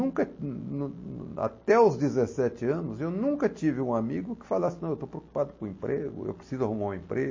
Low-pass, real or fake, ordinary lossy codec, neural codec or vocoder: 7.2 kHz; real; MP3, 32 kbps; none